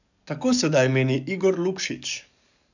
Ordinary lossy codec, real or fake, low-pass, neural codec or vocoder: none; fake; 7.2 kHz; codec, 44.1 kHz, 7.8 kbps, DAC